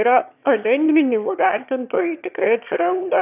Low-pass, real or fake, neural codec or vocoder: 3.6 kHz; fake; autoencoder, 22.05 kHz, a latent of 192 numbers a frame, VITS, trained on one speaker